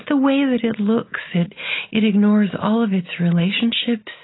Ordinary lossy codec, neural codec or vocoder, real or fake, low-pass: AAC, 16 kbps; none; real; 7.2 kHz